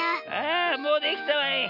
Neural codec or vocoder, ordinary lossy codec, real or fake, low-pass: none; none; real; 5.4 kHz